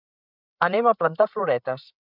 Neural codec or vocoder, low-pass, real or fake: codec, 16 kHz, 8 kbps, FreqCodec, larger model; 5.4 kHz; fake